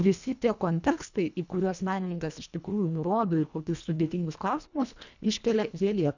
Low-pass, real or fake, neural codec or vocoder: 7.2 kHz; fake; codec, 24 kHz, 1.5 kbps, HILCodec